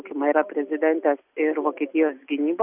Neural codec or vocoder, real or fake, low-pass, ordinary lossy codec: none; real; 3.6 kHz; Opus, 64 kbps